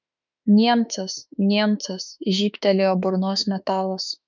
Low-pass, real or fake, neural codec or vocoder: 7.2 kHz; fake; autoencoder, 48 kHz, 32 numbers a frame, DAC-VAE, trained on Japanese speech